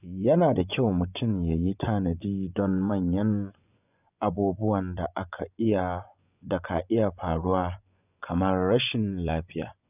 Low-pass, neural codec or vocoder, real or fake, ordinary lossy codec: 3.6 kHz; vocoder, 24 kHz, 100 mel bands, Vocos; fake; none